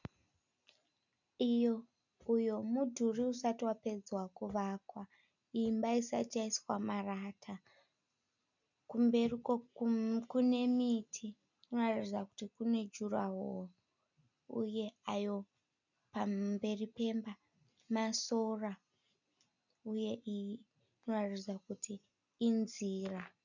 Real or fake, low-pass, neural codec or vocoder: real; 7.2 kHz; none